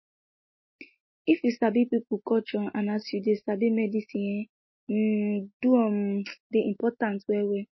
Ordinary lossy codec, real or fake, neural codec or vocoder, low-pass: MP3, 24 kbps; real; none; 7.2 kHz